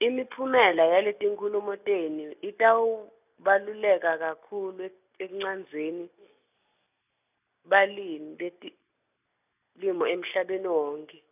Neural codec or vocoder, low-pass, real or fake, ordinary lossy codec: none; 3.6 kHz; real; none